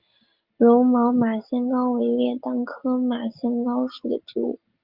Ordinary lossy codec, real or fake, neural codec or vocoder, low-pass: Opus, 32 kbps; real; none; 5.4 kHz